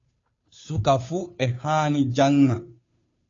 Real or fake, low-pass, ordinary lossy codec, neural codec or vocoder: fake; 7.2 kHz; AAC, 32 kbps; codec, 16 kHz, 2 kbps, FunCodec, trained on Chinese and English, 25 frames a second